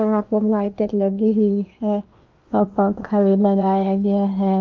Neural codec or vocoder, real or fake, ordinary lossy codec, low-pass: codec, 16 kHz in and 24 kHz out, 0.8 kbps, FocalCodec, streaming, 65536 codes; fake; Opus, 24 kbps; 7.2 kHz